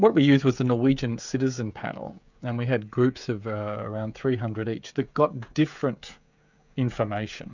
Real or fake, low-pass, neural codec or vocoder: fake; 7.2 kHz; codec, 16 kHz, 16 kbps, FreqCodec, smaller model